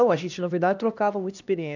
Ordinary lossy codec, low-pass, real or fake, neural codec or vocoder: none; 7.2 kHz; fake; codec, 16 kHz, 1 kbps, X-Codec, HuBERT features, trained on LibriSpeech